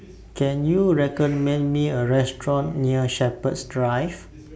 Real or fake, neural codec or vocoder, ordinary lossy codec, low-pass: real; none; none; none